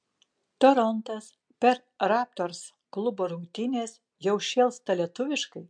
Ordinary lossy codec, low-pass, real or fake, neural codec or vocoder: MP3, 64 kbps; 9.9 kHz; real; none